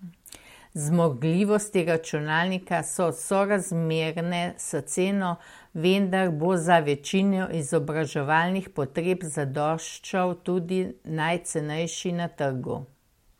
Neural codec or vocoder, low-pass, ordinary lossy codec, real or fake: none; 19.8 kHz; MP3, 64 kbps; real